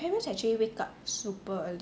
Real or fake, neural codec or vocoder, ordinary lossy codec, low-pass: real; none; none; none